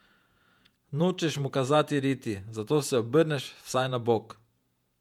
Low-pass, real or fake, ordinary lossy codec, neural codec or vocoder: 19.8 kHz; fake; MP3, 96 kbps; vocoder, 48 kHz, 128 mel bands, Vocos